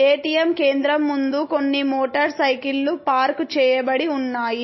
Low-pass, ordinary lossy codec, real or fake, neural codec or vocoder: 7.2 kHz; MP3, 24 kbps; real; none